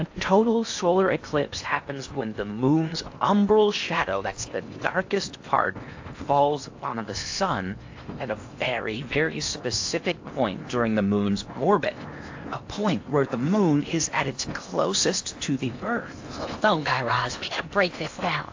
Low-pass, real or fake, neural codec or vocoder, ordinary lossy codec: 7.2 kHz; fake; codec, 16 kHz in and 24 kHz out, 0.8 kbps, FocalCodec, streaming, 65536 codes; AAC, 48 kbps